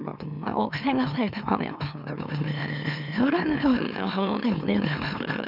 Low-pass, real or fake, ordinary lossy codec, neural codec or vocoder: 5.4 kHz; fake; none; autoencoder, 44.1 kHz, a latent of 192 numbers a frame, MeloTTS